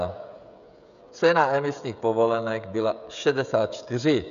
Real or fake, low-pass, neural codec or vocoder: fake; 7.2 kHz; codec, 16 kHz, 16 kbps, FreqCodec, smaller model